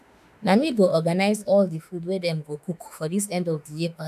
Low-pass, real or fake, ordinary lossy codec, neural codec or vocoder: 14.4 kHz; fake; none; autoencoder, 48 kHz, 32 numbers a frame, DAC-VAE, trained on Japanese speech